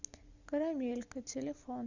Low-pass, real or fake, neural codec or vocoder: 7.2 kHz; real; none